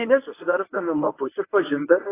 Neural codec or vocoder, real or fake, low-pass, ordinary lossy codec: codec, 24 kHz, 0.9 kbps, WavTokenizer, medium speech release version 1; fake; 3.6 kHz; AAC, 24 kbps